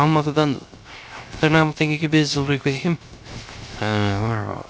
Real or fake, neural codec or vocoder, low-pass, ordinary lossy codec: fake; codec, 16 kHz, 0.3 kbps, FocalCodec; none; none